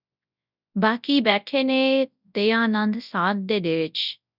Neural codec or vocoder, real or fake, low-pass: codec, 24 kHz, 0.9 kbps, WavTokenizer, large speech release; fake; 5.4 kHz